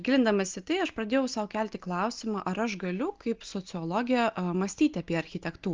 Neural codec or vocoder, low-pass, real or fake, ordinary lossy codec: none; 7.2 kHz; real; Opus, 24 kbps